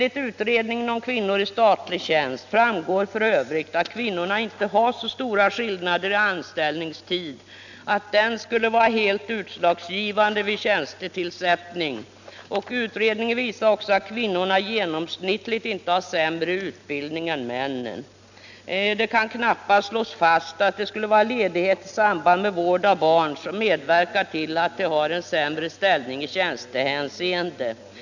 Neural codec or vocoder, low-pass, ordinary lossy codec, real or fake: none; 7.2 kHz; none; real